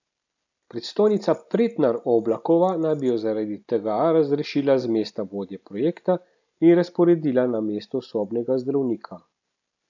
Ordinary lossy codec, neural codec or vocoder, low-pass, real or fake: none; none; 7.2 kHz; real